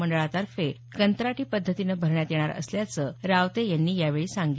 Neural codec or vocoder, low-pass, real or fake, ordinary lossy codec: none; none; real; none